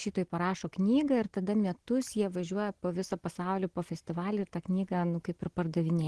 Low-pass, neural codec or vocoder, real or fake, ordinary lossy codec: 10.8 kHz; none; real; Opus, 16 kbps